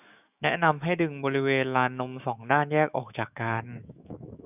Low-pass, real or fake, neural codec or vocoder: 3.6 kHz; fake; autoencoder, 48 kHz, 128 numbers a frame, DAC-VAE, trained on Japanese speech